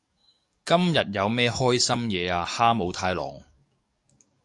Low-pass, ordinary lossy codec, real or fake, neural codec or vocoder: 10.8 kHz; AAC, 64 kbps; fake; codec, 44.1 kHz, 7.8 kbps, DAC